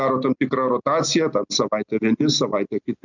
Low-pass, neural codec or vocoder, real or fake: 7.2 kHz; none; real